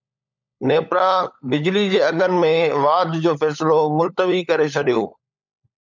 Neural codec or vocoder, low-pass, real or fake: codec, 16 kHz, 16 kbps, FunCodec, trained on LibriTTS, 50 frames a second; 7.2 kHz; fake